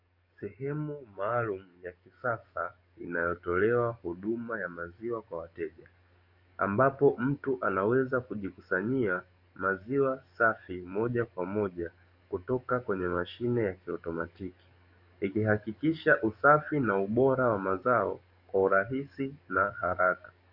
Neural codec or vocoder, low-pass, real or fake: codec, 44.1 kHz, 7.8 kbps, DAC; 5.4 kHz; fake